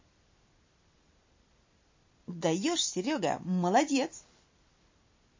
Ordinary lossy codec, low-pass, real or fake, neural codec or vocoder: MP3, 32 kbps; 7.2 kHz; real; none